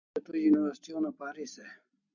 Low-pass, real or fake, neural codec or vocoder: 7.2 kHz; real; none